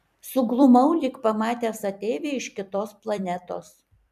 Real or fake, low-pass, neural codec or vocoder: fake; 14.4 kHz; vocoder, 44.1 kHz, 128 mel bands every 256 samples, BigVGAN v2